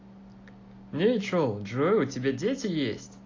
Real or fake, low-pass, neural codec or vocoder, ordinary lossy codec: real; 7.2 kHz; none; AAC, 32 kbps